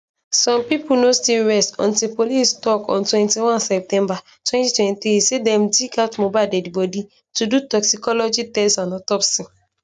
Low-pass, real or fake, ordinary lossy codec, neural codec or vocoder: 10.8 kHz; real; none; none